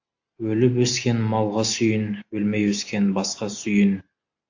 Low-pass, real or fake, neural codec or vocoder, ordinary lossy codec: 7.2 kHz; real; none; AAC, 48 kbps